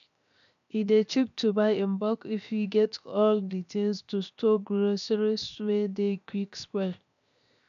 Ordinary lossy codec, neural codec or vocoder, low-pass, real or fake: none; codec, 16 kHz, 0.7 kbps, FocalCodec; 7.2 kHz; fake